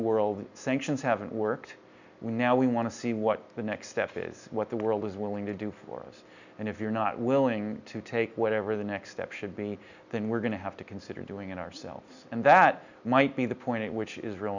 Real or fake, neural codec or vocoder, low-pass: real; none; 7.2 kHz